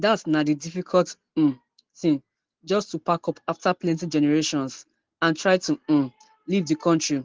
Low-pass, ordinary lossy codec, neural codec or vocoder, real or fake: 7.2 kHz; Opus, 16 kbps; none; real